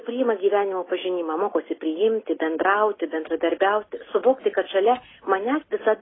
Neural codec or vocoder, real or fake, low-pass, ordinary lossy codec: none; real; 7.2 kHz; AAC, 16 kbps